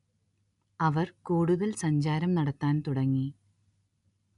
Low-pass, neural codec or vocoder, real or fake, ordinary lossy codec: 10.8 kHz; none; real; none